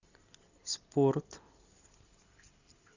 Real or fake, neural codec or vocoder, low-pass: real; none; 7.2 kHz